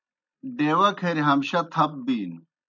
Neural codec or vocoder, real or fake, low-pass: none; real; 7.2 kHz